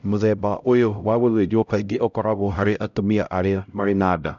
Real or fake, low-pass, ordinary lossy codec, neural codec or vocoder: fake; 7.2 kHz; MP3, 96 kbps; codec, 16 kHz, 0.5 kbps, X-Codec, HuBERT features, trained on LibriSpeech